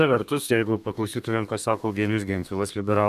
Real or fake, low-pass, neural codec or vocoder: fake; 14.4 kHz; codec, 32 kHz, 1.9 kbps, SNAC